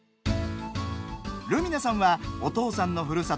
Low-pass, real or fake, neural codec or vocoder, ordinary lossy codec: none; real; none; none